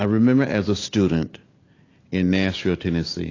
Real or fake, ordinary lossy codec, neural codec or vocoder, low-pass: real; AAC, 32 kbps; none; 7.2 kHz